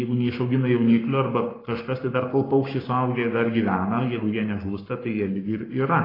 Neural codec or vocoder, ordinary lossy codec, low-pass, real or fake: codec, 44.1 kHz, 7.8 kbps, Pupu-Codec; AAC, 24 kbps; 5.4 kHz; fake